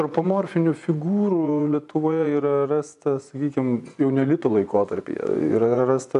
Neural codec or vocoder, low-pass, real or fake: vocoder, 24 kHz, 100 mel bands, Vocos; 10.8 kHz; fake